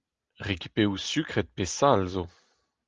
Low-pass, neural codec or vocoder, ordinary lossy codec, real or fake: 7.2 kHz; none; Opus, 32 kbps; real